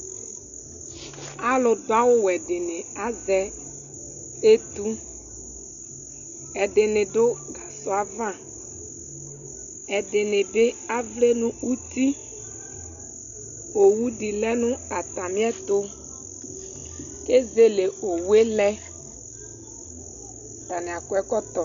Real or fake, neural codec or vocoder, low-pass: real; none; 7.2 kHz